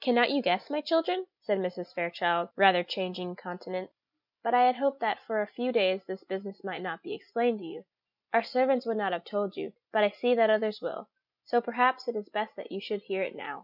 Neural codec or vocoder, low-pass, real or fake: none; 5.4 kHz; real